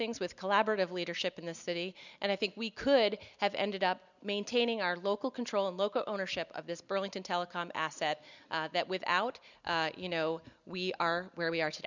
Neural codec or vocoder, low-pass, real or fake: none; 7.2 kHz; real